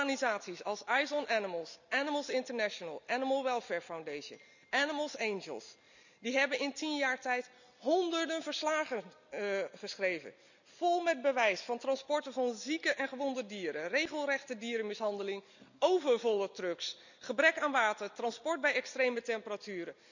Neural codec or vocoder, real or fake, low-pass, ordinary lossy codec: none; real; 7.2 kHz; none